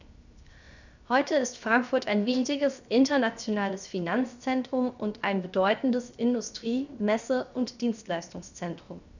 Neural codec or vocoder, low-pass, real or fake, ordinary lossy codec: codec, 16 kHz, 0.7 kbps, FocalCodec; 7.2 kHz; fake; none